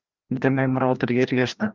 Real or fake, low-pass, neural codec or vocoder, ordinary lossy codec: fake; 7.2 kHz; codec, 16 kHz, 1 kbps, FreqCodec, larger model; Opus, 32 kbps